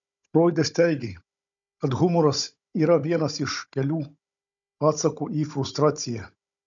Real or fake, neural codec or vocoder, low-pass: fake; codec, 16 kHz, 16 kbps, FunCodec, trained on Chinese and English, 50 frames a second; 7.2 kHz